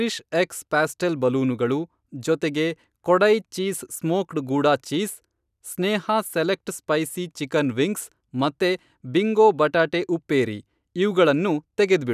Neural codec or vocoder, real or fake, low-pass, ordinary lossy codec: none; real; 14.4 kHz; none